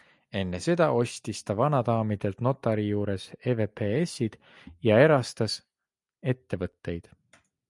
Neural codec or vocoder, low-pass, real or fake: none; 10.8 kHz; real